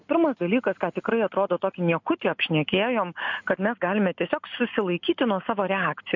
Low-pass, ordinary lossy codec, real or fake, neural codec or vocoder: 7.2 kHz; MP3, 48 kbps; real; none